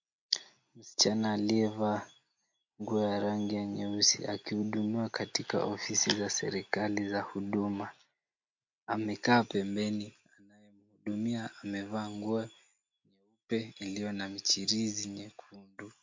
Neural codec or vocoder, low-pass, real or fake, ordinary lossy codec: none; 7.2 kHz; real; MP3, 48 kbps